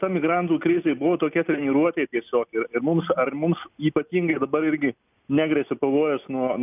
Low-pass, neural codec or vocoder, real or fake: 3.6 kHz; none; real